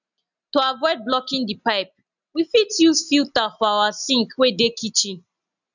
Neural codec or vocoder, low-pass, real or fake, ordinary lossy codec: none; 7.2 kHz; real; none